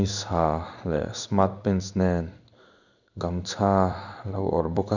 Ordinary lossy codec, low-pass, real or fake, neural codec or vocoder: none; 7.2 kHz; fake; codec, 16 kHz in and 24 kHz out, 1 kbps, XY-Tokenizer